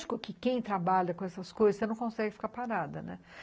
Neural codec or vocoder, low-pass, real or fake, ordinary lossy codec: none; none; real; none